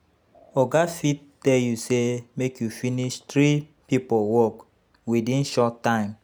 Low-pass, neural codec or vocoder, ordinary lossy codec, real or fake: none; none; none; real